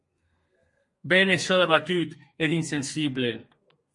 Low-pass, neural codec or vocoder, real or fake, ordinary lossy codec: 10.8 kHz; codec, 32 kHz, 1.9 kbps, SNAC; fake; MP3, 48 kbps